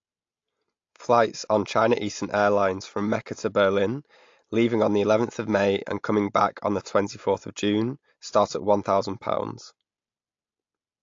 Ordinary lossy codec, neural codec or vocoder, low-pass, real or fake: AAC, 48 kbps; none; 7.2 kHz; real